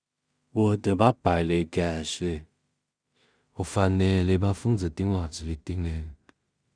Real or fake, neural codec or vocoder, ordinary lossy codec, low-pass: fake; codec, 16 kHz in and 24 kHz out, 0.4 kbps, LongCat-Audio-Codec, two codebook decoder; Opus, 64 kbps; 9.9 kHz